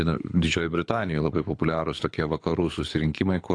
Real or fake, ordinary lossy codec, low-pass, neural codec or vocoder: fake; AAC, 48 kbps; 9.9 kHz; codec, 24 kHz, 6 kbps, HILCodec